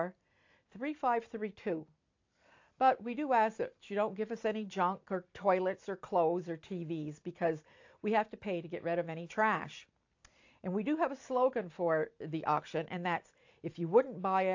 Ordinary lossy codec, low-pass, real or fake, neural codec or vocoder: AAC, 48 kbps; 7.2 kHz; real; none